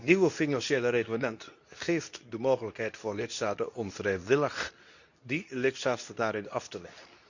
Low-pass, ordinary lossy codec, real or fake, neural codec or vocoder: 7.2 kHz; none; fake; codec, 24 kHz, 0.9 kbps, WavTokenizer, medium speech release version 2